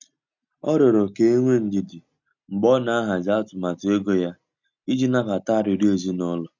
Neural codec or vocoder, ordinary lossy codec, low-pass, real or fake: none; none; 7.2 kHz; real